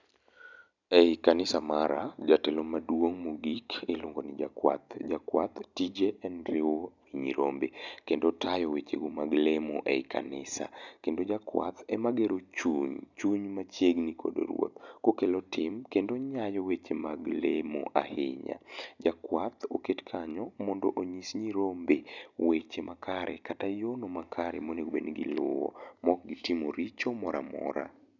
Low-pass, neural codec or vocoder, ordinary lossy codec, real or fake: 7.2 kHz; none; none; real